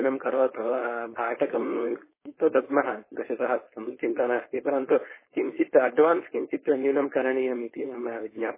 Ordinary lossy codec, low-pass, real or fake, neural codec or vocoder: MP3, 16 kbps; 3.6 kHz; fake; codec, 16 kHz, 4.8 kbps, FACodec